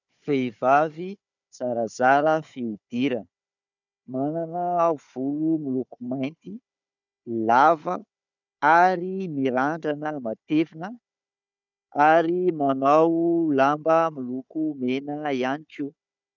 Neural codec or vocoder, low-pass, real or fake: codec, 16 kHz, 4 kbps, FunCodec, trained on Chinese and English, 50 frames a second; 7.2 kHz; fake